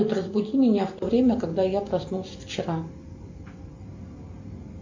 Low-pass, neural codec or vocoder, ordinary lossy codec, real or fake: 7.2 kHz; none; MP3, 64 kbps; real